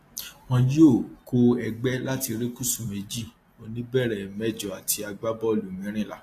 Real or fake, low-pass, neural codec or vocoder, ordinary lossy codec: real; 14.4 kHz; none; AAC, 48 kbps